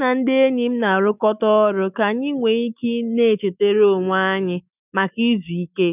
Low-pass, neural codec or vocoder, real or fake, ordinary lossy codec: 3.6 kHz; autoencoder, 48 kHz, 128 numbers a frame, DAC-VAE, trained on Japanese speech; fake; none